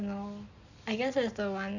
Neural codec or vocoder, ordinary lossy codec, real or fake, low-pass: none; none; real; 7.2 kHz